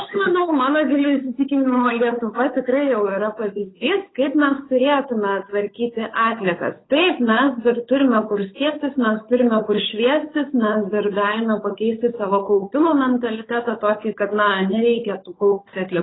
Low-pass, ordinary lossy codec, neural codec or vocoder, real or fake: 7.2 kHz; AAC, 16 kbps; codec, 16 kHz, 8 kbps, FunCodec, trained on Chinese and English, 25 frames a second; fake